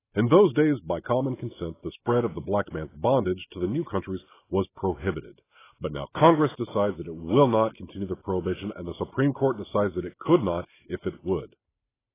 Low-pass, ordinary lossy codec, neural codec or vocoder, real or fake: 3.6 kHz; AAC, 16 kbps; none; real